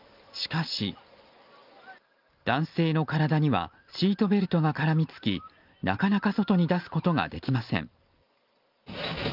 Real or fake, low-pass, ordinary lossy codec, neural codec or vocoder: real; 5.4 kHz; Opus, 24 kbps; none